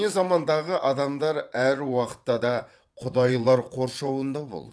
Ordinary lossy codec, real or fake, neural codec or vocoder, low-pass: none; fake; vocoder, 22.05 kHz, 80 mel bands, WaveNeXt; none